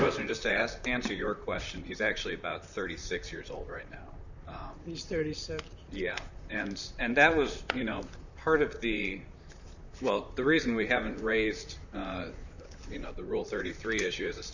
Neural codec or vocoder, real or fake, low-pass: vocoder, 44.1 kHz, 128 mel bands, Pupu-Vocoder; fake; 7.2 kHz